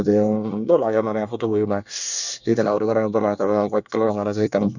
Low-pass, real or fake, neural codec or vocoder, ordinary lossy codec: 7.2 kHz; fake; codec, 24 kHz, 1 kbps, SNAC; none